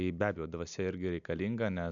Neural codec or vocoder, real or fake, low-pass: none; real; 7.2 kHz